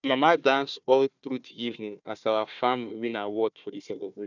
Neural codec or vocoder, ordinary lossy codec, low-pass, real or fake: codec, 16 kHz, 1 kbps, FunCodec, trained on Chinese and English, 50 frames a second; none; 7.2 kHz; fake